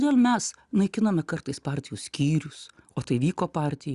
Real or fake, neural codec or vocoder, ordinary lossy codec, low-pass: real; none; Opus, 64 kbps; 10.8 kHz